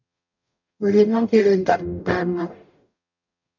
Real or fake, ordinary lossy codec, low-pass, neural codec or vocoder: fake; MP3, 48 kbps; 7.2 kHz; codec, 44.1 kHz, 0.9 kbps, DAC